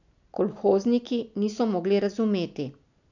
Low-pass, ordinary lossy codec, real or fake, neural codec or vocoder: 7.2 kHz; none; real; none